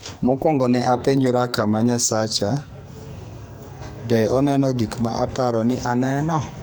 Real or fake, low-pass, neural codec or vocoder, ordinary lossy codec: fake; none; codec, 44.1 kHz, 2.6 kbps, SNAC; none